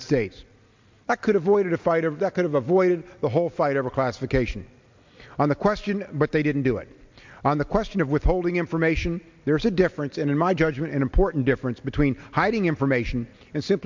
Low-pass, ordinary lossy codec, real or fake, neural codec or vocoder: 7.2 kHz; MP3, 64 kbps; real; none